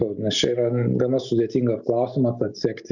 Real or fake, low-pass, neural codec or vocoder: real; 7.2 kHz; none